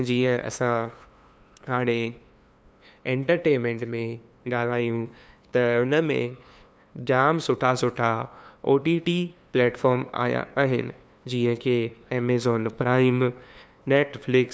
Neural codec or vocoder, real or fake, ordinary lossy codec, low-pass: codec, 16 kHz, 2 kbps, FunCodec, trained on LibriTTS, 25 frames a second; fake; none; none